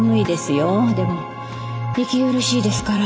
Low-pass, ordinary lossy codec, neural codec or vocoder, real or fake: none; none; none; real